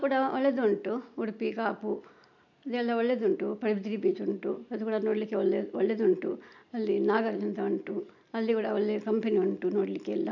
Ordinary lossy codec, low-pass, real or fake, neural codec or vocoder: none; 7.2 kHz; real; none